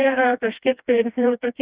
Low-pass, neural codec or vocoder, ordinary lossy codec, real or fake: 3.6 kHz; codec, 16 kHz, 1 kbps, FreqCodec, smaller model; Opus, 64 kbps; fake